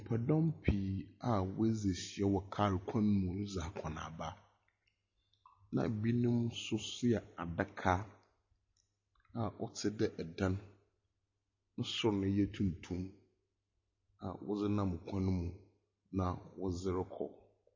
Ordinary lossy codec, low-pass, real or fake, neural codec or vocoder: MP3, 32 kbps; 7.2 kHz; real; none